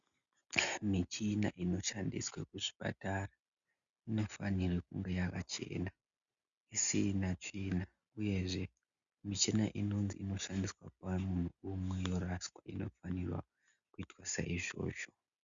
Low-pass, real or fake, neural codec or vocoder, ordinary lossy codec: 7.2 kHz; real; none; Opus, 64 kbps